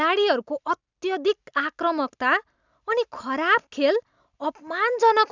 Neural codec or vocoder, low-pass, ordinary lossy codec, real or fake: none; 7.2 kHz; none; real